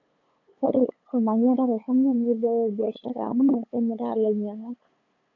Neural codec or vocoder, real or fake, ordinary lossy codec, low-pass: codec, 16 kHz, 8 kbps, FunCodec, trained on LibriTTS, 25 frames a second; fake; none; 7.2 kHz